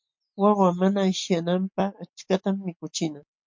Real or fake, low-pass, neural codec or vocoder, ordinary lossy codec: real; 7.2 kHz; none; MP3, 48 kbps